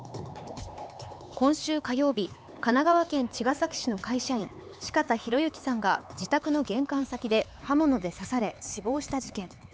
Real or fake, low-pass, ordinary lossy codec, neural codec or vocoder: fake; none; none; codec, 16 kHz, 4 kbps, X-Codec, HuBERT features, trained on LibriSpeech